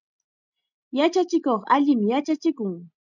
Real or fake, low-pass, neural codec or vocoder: real; 7.2 kHz; none